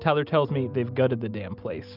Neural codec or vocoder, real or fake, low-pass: none; real; 5.4 kHz